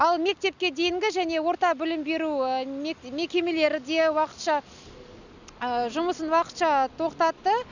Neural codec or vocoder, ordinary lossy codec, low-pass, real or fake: none; none; 7.2 kHz; real